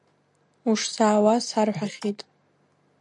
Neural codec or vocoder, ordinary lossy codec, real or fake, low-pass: none; MP3, 96 kbps; real; 10.8 kHz